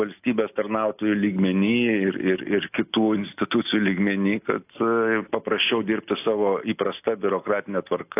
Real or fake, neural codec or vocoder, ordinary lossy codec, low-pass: real; none; AAC, 32 kbps; 3.6 kHz